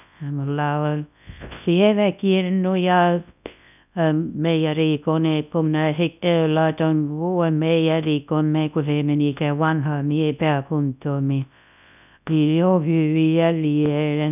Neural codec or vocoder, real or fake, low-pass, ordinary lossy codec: codec, 24 kHz, 0.9 kbps, WavTokenizer, large speech release; fake; 3.6 kHz; none